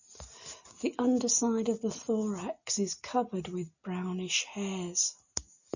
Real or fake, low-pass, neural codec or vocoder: real; 7.2 kHz; none